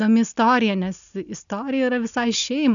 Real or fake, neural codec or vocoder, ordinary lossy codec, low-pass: real; none; MP3, 96 kbps; 7.2 kHz